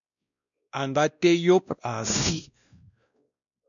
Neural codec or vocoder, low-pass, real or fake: codec, 16 kHz, 1 kbps, X-Codec, WavLM features, trained on Multilingual LibriSpeech; 7.2 kHz; fake